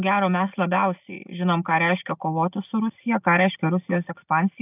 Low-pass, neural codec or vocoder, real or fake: 3.6 kHz; none; real